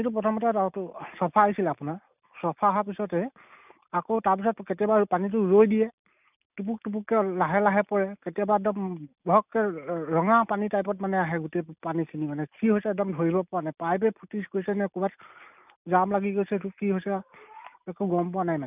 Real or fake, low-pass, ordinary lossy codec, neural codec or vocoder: real; 3.6 kHz; none; none